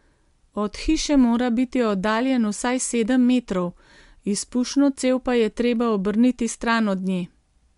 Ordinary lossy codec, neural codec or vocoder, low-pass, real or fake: MP3, 64 kbps; none; 10.8 kHz; real